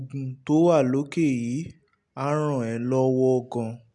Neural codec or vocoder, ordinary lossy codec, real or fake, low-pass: none; none; real; 10.8 kHz